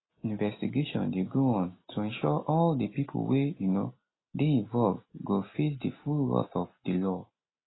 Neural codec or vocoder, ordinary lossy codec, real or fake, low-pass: none; AAC, 16 kbps; real; 7.2 kHz